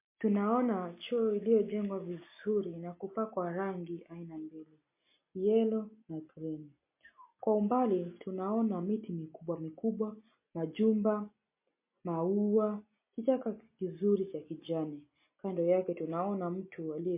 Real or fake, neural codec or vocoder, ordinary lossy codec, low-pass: real; none; MP3, 24 kbps; 3.6 kHz